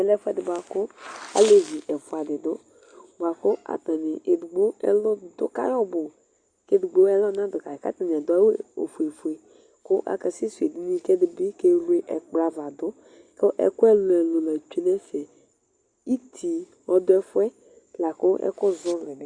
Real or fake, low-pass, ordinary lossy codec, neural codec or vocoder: real; 9.9 kHz; Opus, 64 kbps; none